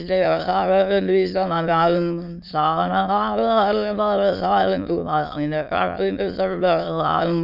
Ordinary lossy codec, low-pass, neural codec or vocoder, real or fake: none; 5.4 kHz; autoencoder, 22.05 kHz, a latent of 192 numbers a frame, VITS, trained on many speakers; fake